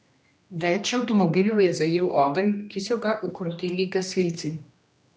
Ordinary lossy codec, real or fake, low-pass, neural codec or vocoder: none; fake; none; codec, 16 kHz, 1 kbps, X-Codec, HuBERT features, trained on general audio